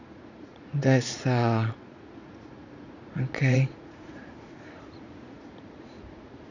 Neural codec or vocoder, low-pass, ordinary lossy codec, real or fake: vocoder, 44.1 kHz, 80 mel bands, Vocos; 7.2 kHz; none; fake